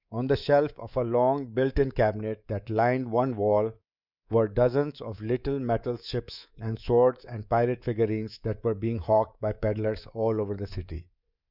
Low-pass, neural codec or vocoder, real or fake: 5.4 kHz; codec, 24 kHz, 3.1 kbps, DualCodec; fake